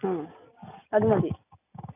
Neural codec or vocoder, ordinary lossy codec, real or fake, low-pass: none; none; real; 3.6 kHz